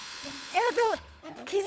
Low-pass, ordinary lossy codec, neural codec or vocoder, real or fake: none; none; codec, 16 kHz, 16 kbps, FunCodec, trained on LibriTTS, 50 frames a second; fake